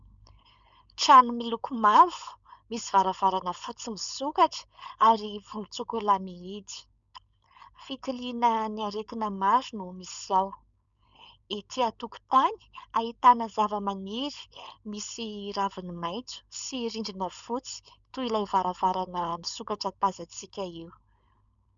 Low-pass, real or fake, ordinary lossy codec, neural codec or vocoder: 7.2 kHz; fake; MP3, 96 kbps; codec, 16 kHz, 4.8 kbps, FACodec